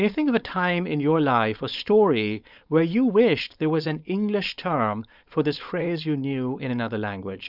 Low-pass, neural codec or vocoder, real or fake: 5.4 kHz; codec, 16 kHz, 4.8 kbps, FACodec; fake